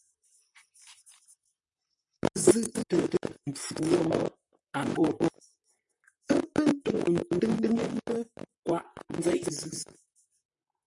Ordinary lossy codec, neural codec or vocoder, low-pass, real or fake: MP3, 64 kbps; vocoder, 44.1 kHz, 128 mel bands, Pupu-Vocoder; 10.8 kHz; fake